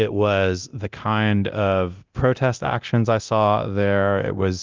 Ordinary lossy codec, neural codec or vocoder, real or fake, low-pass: Opus, 24 kbps; codec, 24 kHz, 0.9 kbps, DualCodec; fake; 7.2 kHz